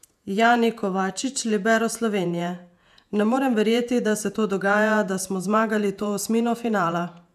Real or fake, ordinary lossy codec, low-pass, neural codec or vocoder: fake; none; 14.4 kHz; vocoder, 48 kHz, 128 mel bands, Vocos